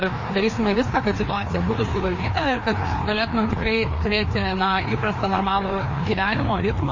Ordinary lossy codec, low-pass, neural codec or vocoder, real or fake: MP3, 32 kbps; 7.2 kHz; codec, 16 kHz, 2 kbps, FreqCodec, larger model; fake